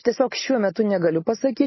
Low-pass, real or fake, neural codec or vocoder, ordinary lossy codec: 7.2 kHz; real; none; MP3, 24 kbps